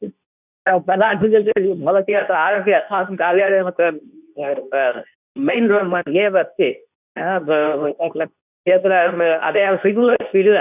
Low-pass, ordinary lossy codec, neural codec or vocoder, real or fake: 3.6 kHz; none; codec, 24 kHz, 0.9 kbps, WavTokenizer, medium speech release version 2; fake